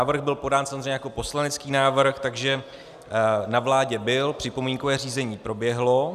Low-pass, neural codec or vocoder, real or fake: 14.4 kHz; none; real